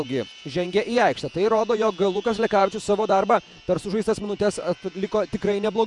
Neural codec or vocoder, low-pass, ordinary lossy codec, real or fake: vocoder, 48 kHz, 128 mel bands, Vocos; 10.8 kHz; MP3, 96 kbps; fake